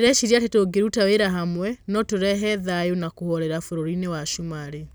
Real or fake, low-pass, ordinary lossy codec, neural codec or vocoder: real; none; none; none